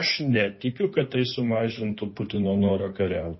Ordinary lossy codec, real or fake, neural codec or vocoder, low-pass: MP3, 24 kbps; fake; codec, 16 kHz in and 24 kHz out, 2.2 kbps, FireRedTTS-2 codec; 7.2 kHz